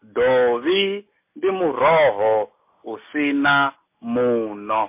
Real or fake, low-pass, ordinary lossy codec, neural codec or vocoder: real; 3.6 kHz; MP3, 24 kbps; none